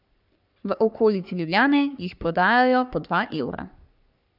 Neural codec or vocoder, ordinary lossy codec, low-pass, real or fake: codec, 44.1 kHz, 3.4 kbps, Pupu-Codec; none; 5.4 kHz; fake